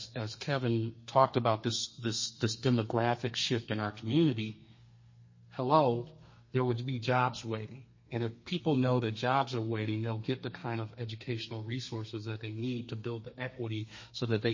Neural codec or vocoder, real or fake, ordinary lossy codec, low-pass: codec, 32 kHz, 1.9 kbps, SNAC; fake; MP3, 32 kbps; 7.2 kHz